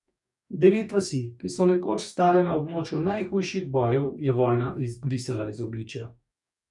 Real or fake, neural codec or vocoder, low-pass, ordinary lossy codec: fake; codec, 44.1 kHz, 2.6 kbps, DAC; 10.8 kHz; none